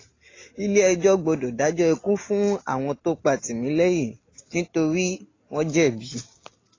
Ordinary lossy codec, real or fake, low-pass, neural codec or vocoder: AAC, 32 kbps; real; 7.2 kHz; none